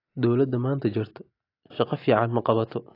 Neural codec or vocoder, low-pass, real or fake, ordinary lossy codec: none; 5.4 kHz; real; AAC, 32 kbps